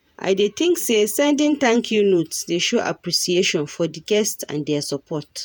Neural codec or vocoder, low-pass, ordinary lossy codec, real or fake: vocoder, 48 kHz, 128 mel bands, Vocos; none; none; fake